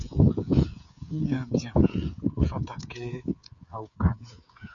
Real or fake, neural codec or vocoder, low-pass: fake; codec, 16 kHz, 8 kbps, FreqCodec, smaller model; 7.2 kHz